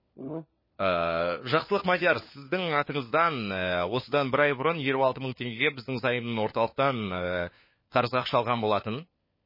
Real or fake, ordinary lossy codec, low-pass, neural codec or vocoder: fake; MP3, 24 kbps; 5.4 kHz; codec, 16 kHz, 4 kbps, FunCodec, trained on LibriTTS, 50 frames a second